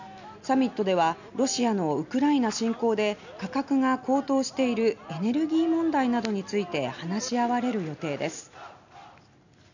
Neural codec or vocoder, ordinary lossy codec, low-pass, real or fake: none; none; 7.2 kHz; real